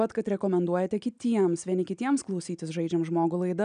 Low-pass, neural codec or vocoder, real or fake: 9.9 kHz; none; real